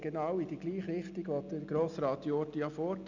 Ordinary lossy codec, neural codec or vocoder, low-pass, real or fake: none; none; 7.2 kHz; real